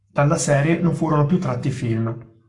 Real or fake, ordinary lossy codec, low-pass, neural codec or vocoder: fake; AAC, 64 kbps; 10.8 kHz; codec, 44.1 kHz, 7.8 kbps, Pupu-Codec